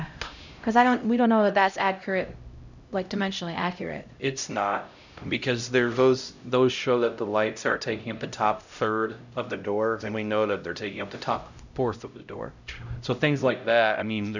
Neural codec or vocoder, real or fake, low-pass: codec, 16 kHz, 0.5 kbps, X-Codec, HuBERT features, trained on LibriSpeech; fake; 7.2 kHz